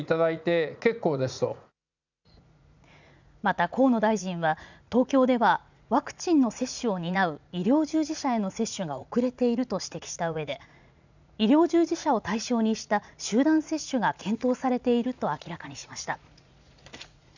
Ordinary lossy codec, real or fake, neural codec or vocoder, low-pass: none; fake; vocoder, 44.1 kHz, 80 mel bands, Vocos; 7.2 kHz